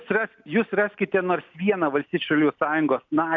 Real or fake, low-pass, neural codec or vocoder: real; 7.2 kHz; none